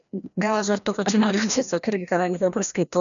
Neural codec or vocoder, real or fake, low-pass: codec, 16 kHz, 1 kbps, FreqCodec, larger model; fake; 7.2 kHz